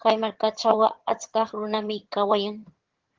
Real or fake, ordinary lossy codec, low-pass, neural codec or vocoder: fake; Opus, 16 kbps; 7.2 kHz; vocoder, 22.05 kHz, 80 mel bands, HiFi-GAN